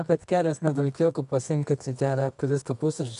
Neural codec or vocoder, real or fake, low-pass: codec, 24 kHz, 0.9 kbps, WavTokenizer, medium music audio release; fake; 10.8 kHz